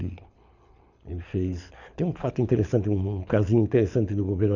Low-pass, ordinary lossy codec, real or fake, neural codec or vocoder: 7.2 kHz; none; fake; codec, 24 kHz, 6 kbps, HILCodec